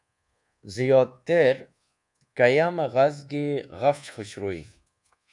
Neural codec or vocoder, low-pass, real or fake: codec, 24 kHz, 1.2 kbps, DualCodec; 10.8 kHz; fake